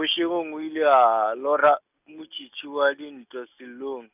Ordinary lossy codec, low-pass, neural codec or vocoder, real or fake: none; 3.6 kHz; none; real